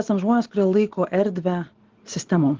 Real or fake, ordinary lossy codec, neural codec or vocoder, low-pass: real; Opus, 16 kbps; none; 7.2 kHz